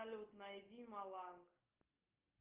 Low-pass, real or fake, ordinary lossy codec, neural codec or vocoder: 3.6 kHz; real; Opus, 24 kbps; none